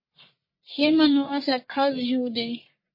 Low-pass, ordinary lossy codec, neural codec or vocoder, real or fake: 5.4 kHz; MP3, 24 kbps; codec, 44.1 kHz, 1.7 kbps, Pupu-Codec; fake